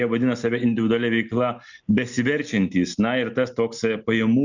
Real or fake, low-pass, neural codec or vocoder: real; 7.2 kHz; none